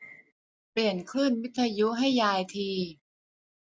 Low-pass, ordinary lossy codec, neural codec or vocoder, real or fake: none; none; none; real